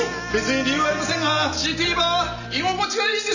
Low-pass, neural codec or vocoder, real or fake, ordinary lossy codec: 7.2 kHz; none; real; none